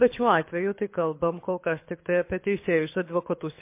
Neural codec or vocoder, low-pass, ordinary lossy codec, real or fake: codec, 24 kHz, 6 kbps, HILCodec; 3.6 kHz; MP3, 24 kbps; fake